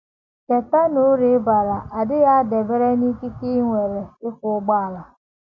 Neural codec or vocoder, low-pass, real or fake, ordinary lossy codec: none; 7.2 kHz; real; MP3, 48 kbps